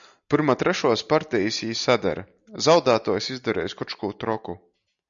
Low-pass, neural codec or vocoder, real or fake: 7.2 kHz; none; real